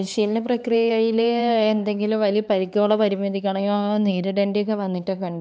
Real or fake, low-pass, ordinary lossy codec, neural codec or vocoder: fake; none; none; codec, 16 kHz, 4 kbps, X-Codec, HuBERT features, trained on LibriSpeech